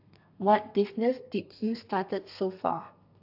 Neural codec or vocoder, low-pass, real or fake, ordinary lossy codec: codec, 32 kHz, 1.9 kbps, SNAC; 5.4 kHz; fake; AAC, 48 kbps